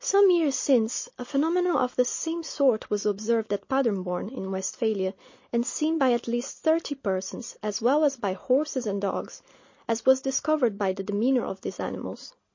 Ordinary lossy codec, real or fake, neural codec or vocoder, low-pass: MP3, 32 kbps; real; none; 7.2 kHz